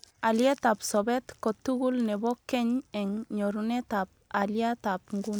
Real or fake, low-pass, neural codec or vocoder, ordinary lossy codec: real; none; none; none